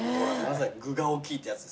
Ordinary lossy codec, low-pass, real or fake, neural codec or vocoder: none; none; real; none